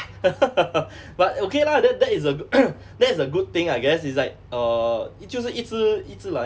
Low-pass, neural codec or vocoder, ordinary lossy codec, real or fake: none; none; none; real